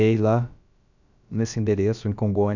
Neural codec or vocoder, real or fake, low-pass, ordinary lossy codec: codec, 16 kHz, about 1 kbps, DyCAST, with the encoder's durations; fake; 7.2 kHz; none